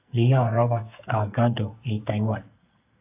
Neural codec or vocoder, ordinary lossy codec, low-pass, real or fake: codec, 44.1 kHz, 2.6 kbps, SNAC; AAC, 24 kbps; 3.6 kHz; fake